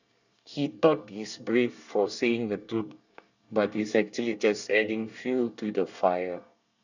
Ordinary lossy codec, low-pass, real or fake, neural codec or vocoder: none; 7.2 kHz; fake; codec, 24 kHz, 1 kbps, SNAC